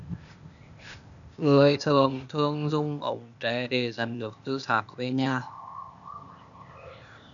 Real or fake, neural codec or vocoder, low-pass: fake; codec, 16 kHz, 0.8 kbps, ZipCodec; 7.2 kHz